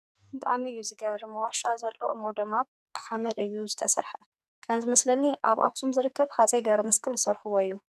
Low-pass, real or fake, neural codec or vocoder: 14.4 kHz; fake; codec, 44.1 kHz, 2.6 kbps, SNAC